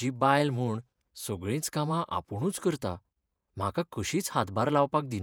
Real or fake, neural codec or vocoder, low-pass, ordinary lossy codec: fake; vocoder, 48 kHz, 128 mel bands, Vocos; none; none